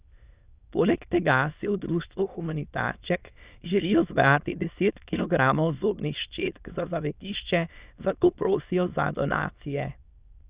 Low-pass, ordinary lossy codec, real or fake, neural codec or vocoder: 3.6 kHz; Opus, 64 kbps; fake; autoencoder, 22.05 kHz, a latent of 192 numbers a frame, VITS, trained on many speakers